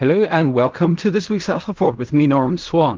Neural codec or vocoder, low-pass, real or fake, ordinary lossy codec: codec, 16 kHz in and 24 kHz out, 0.4 kbps, LongCat-Audio-Codec, fine tuned four codebook decoder; 7.2 kHz; fake; Opus, 32 kbps